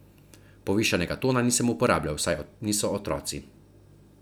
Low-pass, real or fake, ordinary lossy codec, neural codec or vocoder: none; real; none; none